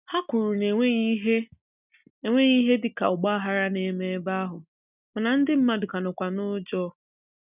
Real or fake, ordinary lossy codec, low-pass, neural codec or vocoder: real; none; 3.6 kHz; none